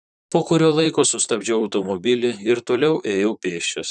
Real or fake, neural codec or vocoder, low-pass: fake; vocoder, 44.1 kHz, 128 mel bands, Pupu-Vocoder; 10.8 kHz